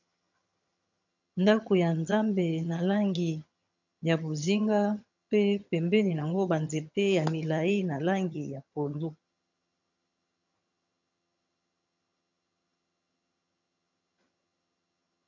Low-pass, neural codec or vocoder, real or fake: 7.2 kHz; vocoder, 22.05 kHz, 80 mel bands, HiFi-GAN; fake